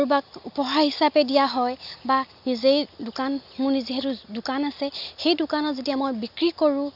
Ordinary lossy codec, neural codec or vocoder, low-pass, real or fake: none; none; 5.4 kHz; real